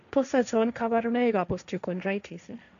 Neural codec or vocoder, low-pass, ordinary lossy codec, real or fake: codec, 16 kHz, 1.1 kbps, Voila-Tokenizer; 7.2 kHz; none; fake